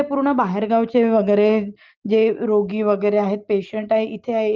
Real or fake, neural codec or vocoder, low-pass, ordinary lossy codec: real; none; 7.2 kHz; Opus, 32 kbps